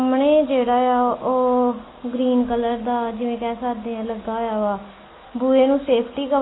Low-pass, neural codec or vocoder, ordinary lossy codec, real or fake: 7.2 kHz; none; AAC, 16 kbps; real